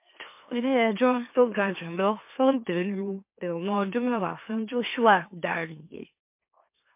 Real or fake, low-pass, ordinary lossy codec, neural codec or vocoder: fake; 3.6 kHz; MP3, 32 kbps; autoencoder, 44.1 kHz, a latent of 192 numbers a frame, MeloTTS